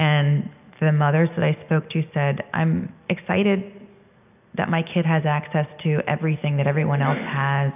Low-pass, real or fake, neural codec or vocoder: 3.6 kHz; real; none